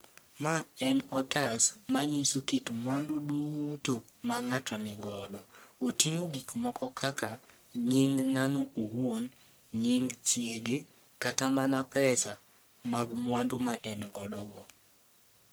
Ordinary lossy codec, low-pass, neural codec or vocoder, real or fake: none; none; codec, 44.1 kHz, 1.7 kbps, Pupu-Codec; fake